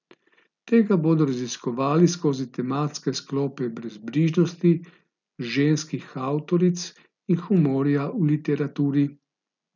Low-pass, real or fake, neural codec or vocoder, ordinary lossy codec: 7.2 kHz; real; none; none